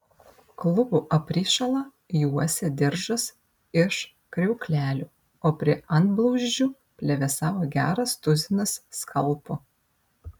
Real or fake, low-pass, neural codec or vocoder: real; 19.8 kHz; none